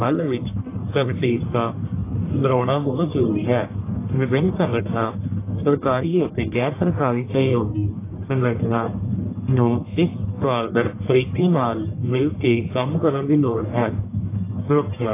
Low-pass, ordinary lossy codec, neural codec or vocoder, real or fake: 3.6 kHz; AAC, 24 kbps; codec, 44.1 kHz, 1.7 kbps, Pupu-Codec; fake